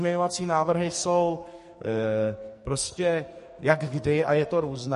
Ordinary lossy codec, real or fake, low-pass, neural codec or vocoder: MP3, 48 kbps; fake; 14.4 kHz; codec, 44.1 kHz, 2.6 kbps, SNAC